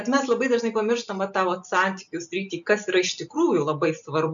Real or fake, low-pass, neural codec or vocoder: real; 7.2 kHz; none